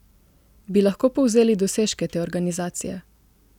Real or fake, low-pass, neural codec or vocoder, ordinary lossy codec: real; 19.8 kHz; none; none